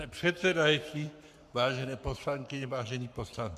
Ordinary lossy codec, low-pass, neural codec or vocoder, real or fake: AAC, 96 kbps; 14.4 kHz; codec, 44.1 kHz, 7.8 kbps, Pupu-Codec; fake